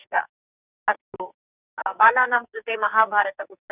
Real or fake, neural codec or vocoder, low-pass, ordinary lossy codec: fake; codec, 44.1 kHz, 2.6 kbps, SNAC; 3.6 kHz; none